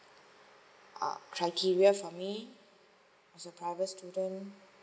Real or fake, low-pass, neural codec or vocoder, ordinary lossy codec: real; none; none; none